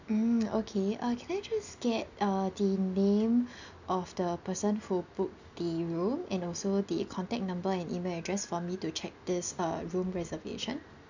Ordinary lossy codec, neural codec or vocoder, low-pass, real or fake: none; none; 7.2 kHz; real